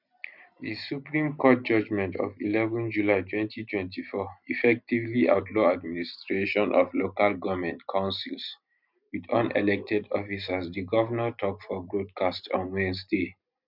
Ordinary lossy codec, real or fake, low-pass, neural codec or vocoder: none; real; 5.4 kHz; none